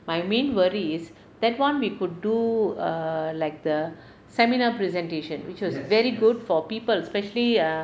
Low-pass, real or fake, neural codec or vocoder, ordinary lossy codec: none; real; none; none